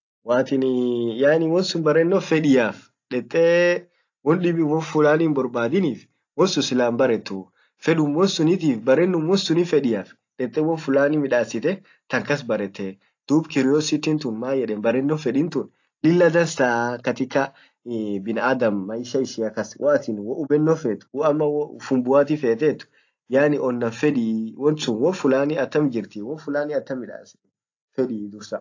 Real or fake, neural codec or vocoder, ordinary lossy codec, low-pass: real; none; AAC, 48 kbps; 7.2 kHz